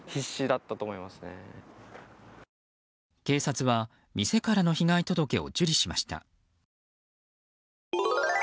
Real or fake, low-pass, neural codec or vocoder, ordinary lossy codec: real; none; none; none